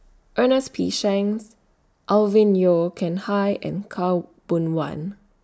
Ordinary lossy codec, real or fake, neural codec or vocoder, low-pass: none; real; none; none